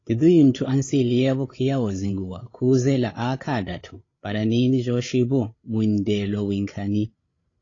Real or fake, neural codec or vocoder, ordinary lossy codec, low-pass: fake; codec, 16 kHz, 8 kbps, FreqCodec, larger model; AAC, 32 kbps; 7.2 kHz